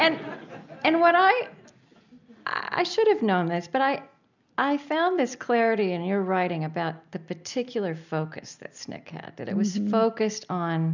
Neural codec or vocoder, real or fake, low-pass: none; real; 7.2 kHz